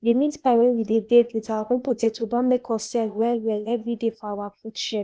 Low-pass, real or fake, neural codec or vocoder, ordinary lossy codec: none; fake; codec, 16 kHz, 0.8 kbps, ZipCodec; none